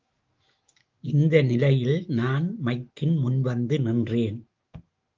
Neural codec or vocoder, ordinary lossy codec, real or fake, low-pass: autoencoder, 48 kHz, 128 numbers a frame, DAC-VAE, trained on Japanese speech; Opus, 32 kbps; fake; 7.2 kHz